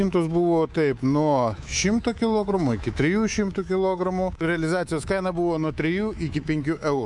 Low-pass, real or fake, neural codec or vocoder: 10.8 kHz; fake; codec, 24 kHz, 3.1 kbps, DualCodec